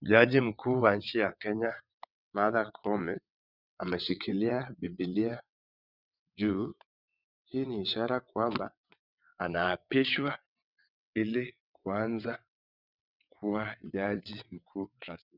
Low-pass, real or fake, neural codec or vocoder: 5.4 kHz; fake; vocoder, 22.05 kHz, 80 mel bands, WaveNeXt